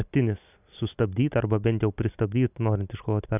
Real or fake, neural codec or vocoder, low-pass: real; none; 3.6 kHz